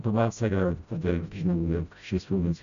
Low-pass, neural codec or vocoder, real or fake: 7.2 kHz; codec, 16 kHz, 0.5 kbps, FreqCodec, smaller model; fake